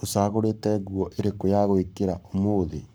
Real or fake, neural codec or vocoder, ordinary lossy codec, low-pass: fake; codec, 44.1 kHz, 7.8 kbps, Pupu-Codec; none; none